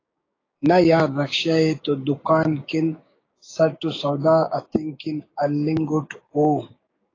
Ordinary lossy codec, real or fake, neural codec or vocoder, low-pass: AAC, 32 kbps; fake; codec, 16 kHz, 6 kbps, DAC; 7.2 kHz